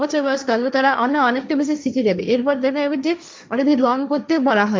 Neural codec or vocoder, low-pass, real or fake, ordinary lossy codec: codec, 16 kHz, 1.1 kbps, Voila-Tokenizer; none; fake; none